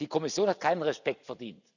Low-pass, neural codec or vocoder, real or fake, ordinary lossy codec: 7.2 kHz; none; real; none